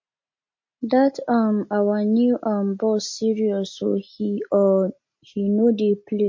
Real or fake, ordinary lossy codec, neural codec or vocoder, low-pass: real; MP3, 32 kbps; none; 7.2 kHz